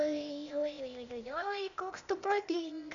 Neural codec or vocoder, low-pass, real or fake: codec, 16 kHz, 0.8 kbps, ZipCodec; 7.2 kHz; fake